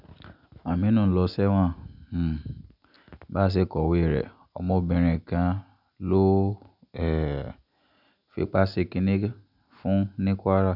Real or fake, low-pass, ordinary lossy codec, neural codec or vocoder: real; 5.4 kHz; none; none